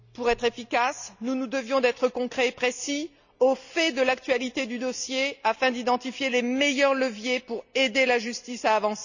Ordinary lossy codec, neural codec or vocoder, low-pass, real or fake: none; none; 7.2 kHz; real